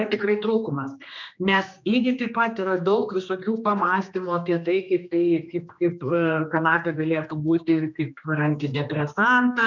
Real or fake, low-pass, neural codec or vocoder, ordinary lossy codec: fake; 7.2 kHz; codec, 16 kHz, 2 kbps, X-Codec, HuBERT features, trained on general audio; MP3, 64 kbps